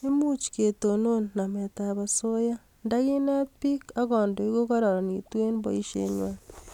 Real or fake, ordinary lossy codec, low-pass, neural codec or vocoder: real; none; 19.8 kHz; none